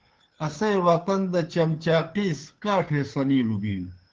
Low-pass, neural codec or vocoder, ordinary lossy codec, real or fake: 7.2 kHz; codec, 16 kHz, 2 kbps, FunCodec, trained on Chinese and English, 25 frames a second; Opus, 16 kbps; fake